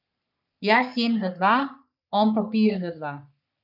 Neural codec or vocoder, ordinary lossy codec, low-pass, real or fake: codec, 44.1 kHz, 3.4 kbps, Pupu-Codec; none; 5.4 kHz; fake